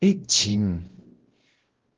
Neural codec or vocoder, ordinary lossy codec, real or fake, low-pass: codec, 16 kHz, 0.5 kbps, X-Codec, WavLM features, trained on Multilingual LibriSpeech; Opus, 16 kbps; fake; 7.2 kHz